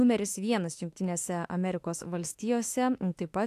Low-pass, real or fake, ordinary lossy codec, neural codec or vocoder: 14.4 kHz; fake; AAC, 64 kbps; autoencoder, 48 kHz, 32 numbers a frame, DAC-VAE, trained on Japanese speech